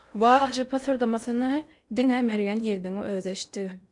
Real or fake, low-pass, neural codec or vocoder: fake; 10.8 kHz; codec, 16 kHz in and 24 kHz out, 0.6 kbps, FocalCodec, streaming, 4096 codes